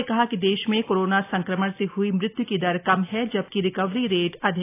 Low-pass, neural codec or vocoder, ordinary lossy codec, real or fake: 3.6 kHz; none; AAC, 24 kbps; real